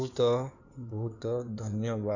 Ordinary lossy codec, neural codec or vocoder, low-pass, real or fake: none; codec, 16 kHz in and 24 kHz out, 2.2 kbps, FireRedTTS-2 codec; 7.2 kHz; fake